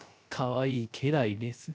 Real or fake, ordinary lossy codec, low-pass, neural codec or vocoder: fake; none; none; codec, 16 kHz, 0.3 kbps, FocalCodec